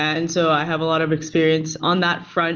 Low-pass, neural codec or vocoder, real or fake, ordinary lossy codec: 7.2 kHz; none; real; Opus, 24 kbps